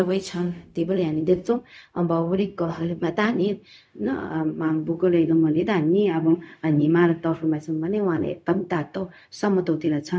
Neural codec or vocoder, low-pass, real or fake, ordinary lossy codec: codec, 16 kHz, 0.4 kbps, LongCat-Audio-Codec; none; fake; none